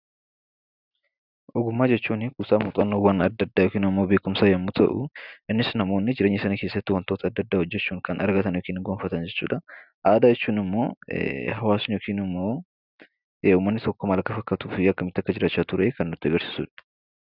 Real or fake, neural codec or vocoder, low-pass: real; none; 5.4 kHz